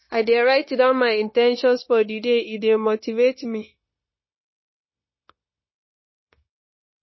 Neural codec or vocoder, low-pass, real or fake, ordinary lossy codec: codec, 16 kHz, 0.9 kbps, LongCat-Audio-Codec; 7.2 kHz; fake; MP3, 24 kbps